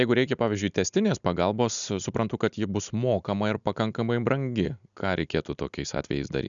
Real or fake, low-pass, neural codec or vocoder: real; 7.2 kHz; none